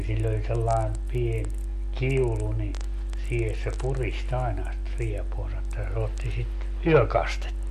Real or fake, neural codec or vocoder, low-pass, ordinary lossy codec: real; none; 14.4 kHz; MP3, 64 kbps